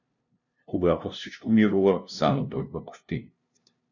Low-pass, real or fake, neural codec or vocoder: 7.2 kHz; fake; codec, 16 kHz, 0.5 kbps, FunCodec, trained on LibriTTS, 25 frames a second